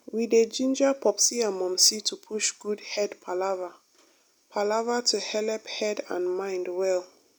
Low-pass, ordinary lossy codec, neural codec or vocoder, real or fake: none; none; none; real